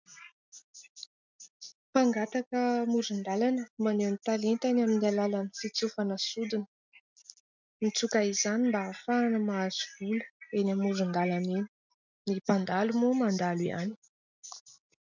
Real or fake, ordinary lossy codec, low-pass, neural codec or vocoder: real; MP3, 64 kbps; 7.2 kHz; none